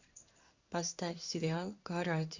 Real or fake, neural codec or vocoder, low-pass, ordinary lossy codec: fake; codec, 16 kHz in and 24 kHz out, 1 kbps, XY-Tokenizer; 7.2 kHz; Opus, 64 kbps